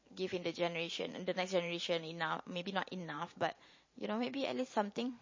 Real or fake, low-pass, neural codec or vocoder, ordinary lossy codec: fake; 7.2 kHz; vocoder, 44.1 kHz, 128 mel bands every 512 samples, BigVGAN v2; MP3, 32 kbps